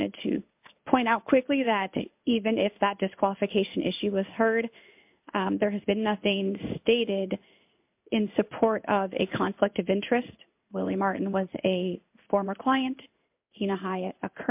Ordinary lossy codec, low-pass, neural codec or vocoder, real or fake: MP3, 32 kbps; 3.6 kHz; codec, 16 kHz in and 24 kHz out, 1 kbps, XY-Tokenizer; fake